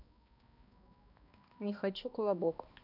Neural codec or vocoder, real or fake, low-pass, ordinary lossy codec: codec, 16 kHz, 1 kbps, X-Codec, HuBERT features, trained on balanced general audio; fake; 5.4 kHz; none